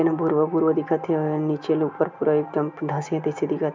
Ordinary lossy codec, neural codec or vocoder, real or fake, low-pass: MP3, 64 kbps; none; real; 7.2 kHz